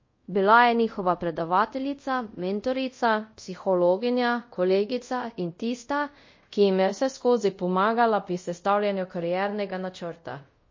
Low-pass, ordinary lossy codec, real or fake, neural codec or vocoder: 7.2 kHz; MP3, 32 kbps; fake; codec, 24 kHz, 0.5 kbps, DualCodec